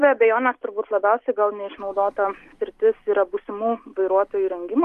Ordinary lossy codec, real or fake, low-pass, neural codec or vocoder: Opus, 32 kbps; real; 14.4 kHz; none